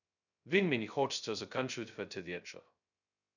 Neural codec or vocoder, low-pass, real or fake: codec, 16 kHz, 0.2 kbps, FocalCodec; 7.2 kHz; fake